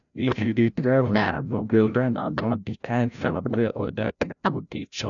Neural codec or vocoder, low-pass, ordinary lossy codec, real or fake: codec, 16 kHz, 0.5 kbps, FreqCodec, larger model; 7.2 kHz; none; fake